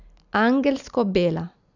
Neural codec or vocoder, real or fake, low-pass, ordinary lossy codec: none; real; 7.2 kHz; none